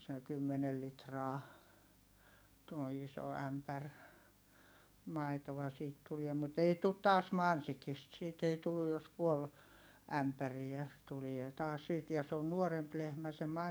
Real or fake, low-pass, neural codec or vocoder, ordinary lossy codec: fake; none; codec, 44.1 kHz, 7.8 kbps, DAC; none